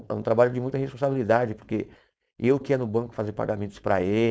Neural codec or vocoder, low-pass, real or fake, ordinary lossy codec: codec, 16 kHz, 4.8 kbps, FACodec; none; fake; none